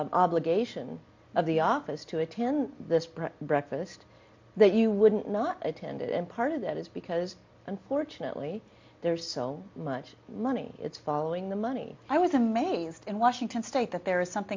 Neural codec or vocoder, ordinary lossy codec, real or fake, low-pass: none; MP3, 48 kbps; real; 7.2 kHz